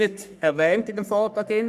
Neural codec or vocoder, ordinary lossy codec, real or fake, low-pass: codec, 44.1 kHz, 3.4 kbps, Pupu-Codec; none; fake; 14.4 kHz